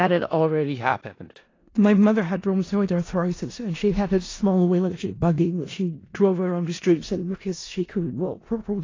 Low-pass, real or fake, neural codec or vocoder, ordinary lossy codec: 7.2 kHz; fake; codec, 16 kHz in and 24 kHz out, 0.4 kbps, LongCat-Audio-Codec, four codebook decoder; AAC, 32 kbps